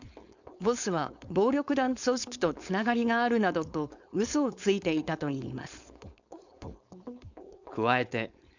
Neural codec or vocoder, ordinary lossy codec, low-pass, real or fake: codec, 16 kHz, 4.8 kbps, FACodec; none; 7.2 kHz; fake